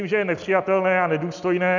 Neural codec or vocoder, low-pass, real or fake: none; 7.2 kHz; real